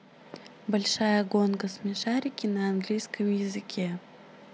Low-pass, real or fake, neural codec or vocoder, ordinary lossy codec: none; real; none; none